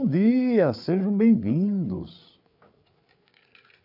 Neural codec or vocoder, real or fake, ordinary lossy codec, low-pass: codec, 16 kHz, 8 kbps, FreqCodec, larger model; fake; none; 5.4 kHz